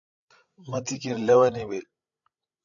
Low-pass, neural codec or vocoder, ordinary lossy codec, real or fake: 7.2 kHz; codec, 16 kHz, 16 kbps, FreqCodec, larger model; AAC, 64 kbps; fake